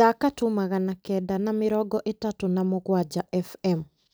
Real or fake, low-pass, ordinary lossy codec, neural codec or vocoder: real; none; none; none